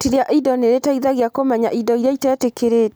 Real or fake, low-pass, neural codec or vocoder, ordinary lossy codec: real; none; none; none